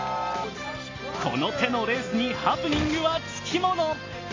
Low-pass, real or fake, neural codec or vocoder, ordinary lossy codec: 7.2 kHz; real; none; AAC, 32 kbps